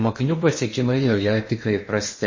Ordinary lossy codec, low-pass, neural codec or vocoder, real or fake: MP3, 32 kbps; 7.2 kHz; codec, 16 kHz in and 24 kHz out, 0.8 kbps, FocalCodec, streaming, 65536 codes; fake